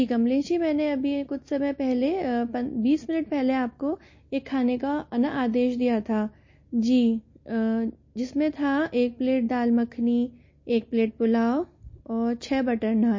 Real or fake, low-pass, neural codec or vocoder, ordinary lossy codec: real; 7.2 kHz; none; MP3, 32 kbps